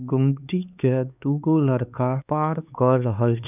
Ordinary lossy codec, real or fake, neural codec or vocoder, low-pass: none; fake; codec, 16 kHz, 4 kbps, X-Codec, HuBERT features, trained on LibriSpeech; 3.6 kHz